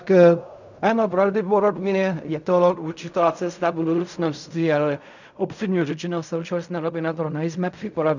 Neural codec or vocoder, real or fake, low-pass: codec, 16 kHz in and 24 kHz out, 0.4 kbps, LongCat-Audio-Codec, fine tuned four codebook decoder; fake; 7.2 kHz